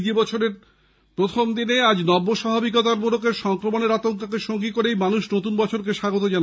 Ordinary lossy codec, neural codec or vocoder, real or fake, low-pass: none; none; real; none